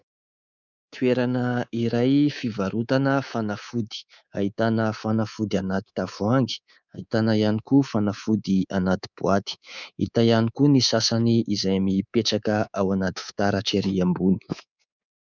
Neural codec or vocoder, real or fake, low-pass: codec, 24 kHz, 3.1 kbps, DualCodec; fake; 7.2 kHz